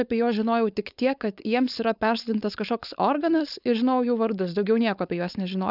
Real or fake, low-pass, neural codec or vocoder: fake; 5.4 kHz; codec, 16 kHz, 4.8 kbps, FACodec